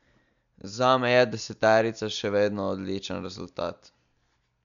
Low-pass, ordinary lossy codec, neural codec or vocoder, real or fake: 7.2 kHz; none; none; real